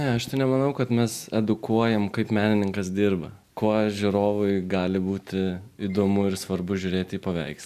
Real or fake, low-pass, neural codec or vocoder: real; 14.4 kHz; none